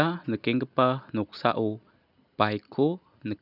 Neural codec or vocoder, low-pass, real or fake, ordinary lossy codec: none; 5.4 kHz; real; none